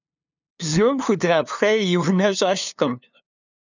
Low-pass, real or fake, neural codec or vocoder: 7.2 kHz; fake; codec, 16 kHz, 2 kbps, FunCodec, trained on LibriTTS, 25 frames a second